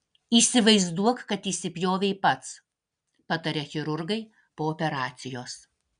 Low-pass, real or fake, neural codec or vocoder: 9.9 kHz; real; none